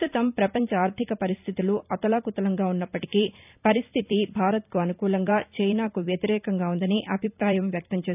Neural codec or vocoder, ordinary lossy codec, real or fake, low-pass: vocoder, 44.1 kHz, 128 mel bands every 256 samples, BigVGAN v2; none; fake; 3.6 kHz